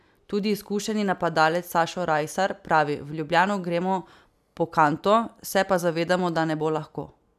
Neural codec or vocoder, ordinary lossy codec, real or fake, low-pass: none; none; real; 14.4 kHz